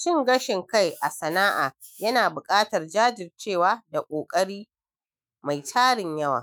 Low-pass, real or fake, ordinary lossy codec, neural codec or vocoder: none; fake; none; autoencoder, 48 kHz, 128 numbers a frame, DAC-VAE, trained on Japanese speech